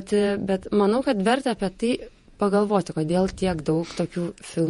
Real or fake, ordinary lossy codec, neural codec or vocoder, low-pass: fake; MP3, 48 kbps; vocoder, 48 kHz, 128 mel bands, Vocos; 19.8 kHz